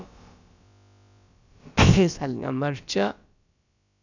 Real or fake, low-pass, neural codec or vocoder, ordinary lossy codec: fake; 7.2 kHz; codec, 16 kHz, about 1 kbps, DyCAST, with the encoder's durations; none